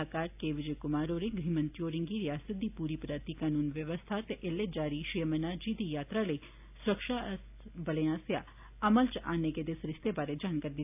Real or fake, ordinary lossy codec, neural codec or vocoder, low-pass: real; AAC, 32 kbps; none; 3.6 kHz